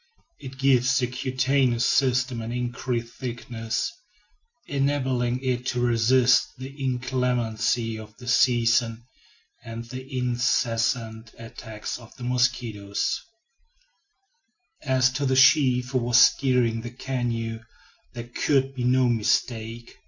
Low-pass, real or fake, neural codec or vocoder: 7.2 kHz; real; none